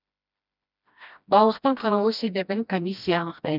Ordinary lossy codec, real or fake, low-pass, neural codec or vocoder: none; fake; 5.4 kHz; codec, 16 kHz, 1 kbps, FreqCodec, smaller model